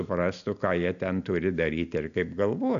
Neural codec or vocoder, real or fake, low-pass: none; real; 7.2 kHz